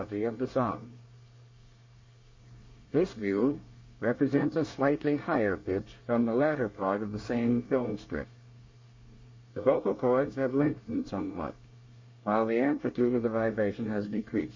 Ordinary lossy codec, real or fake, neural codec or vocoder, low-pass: MP3, 32 kbps; fake; codec, 24 kHz, 1 kbps, SNAC; 7.2 kHz